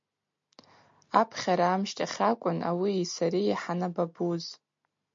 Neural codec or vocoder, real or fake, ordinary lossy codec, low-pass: none; real; MP3, 48 kbps; 7.2 kHz